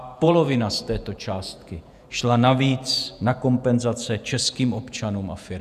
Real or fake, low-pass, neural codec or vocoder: fake; 14.4 kHz; vocoder, 48 kHz, 128 mel bands, Vocos